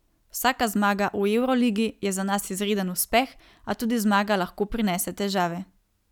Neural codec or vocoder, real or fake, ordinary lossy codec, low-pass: none; real; none; 19.8 kHz